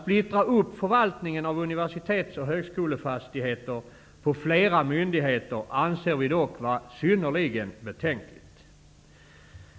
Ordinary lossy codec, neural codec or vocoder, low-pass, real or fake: none; none; none; real